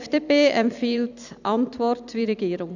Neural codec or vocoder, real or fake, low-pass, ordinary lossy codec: vocoder, 44.1 kHz, 128 mel bands every 256 samples, BigVGAN v2; fake; 7.2 kHz; none